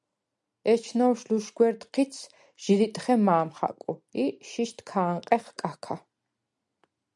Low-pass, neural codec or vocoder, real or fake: 10.8 kHz; none; real